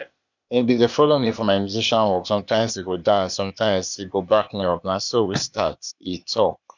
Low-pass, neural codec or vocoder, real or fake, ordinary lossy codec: 7.2 kHz; codec, 16 kHz, 0.8 kbps, ZipCodec; fake; none